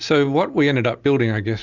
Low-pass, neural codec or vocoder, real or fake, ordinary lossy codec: 7.2 kHz; none; real; Opus, 64 kbps